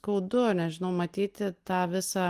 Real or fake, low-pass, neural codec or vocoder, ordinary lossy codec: real; 14.4 kHz; none; Opus, 24 kbps